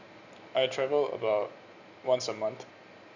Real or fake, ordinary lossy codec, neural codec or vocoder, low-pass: real; none; none; 7.2 kHz